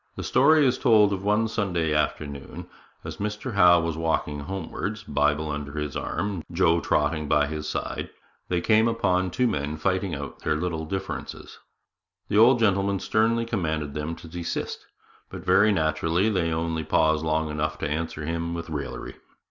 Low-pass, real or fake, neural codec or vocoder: 7.2 kHz; real; none